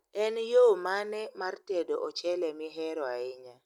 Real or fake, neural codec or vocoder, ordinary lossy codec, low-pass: real; none; none; 19.8 kHz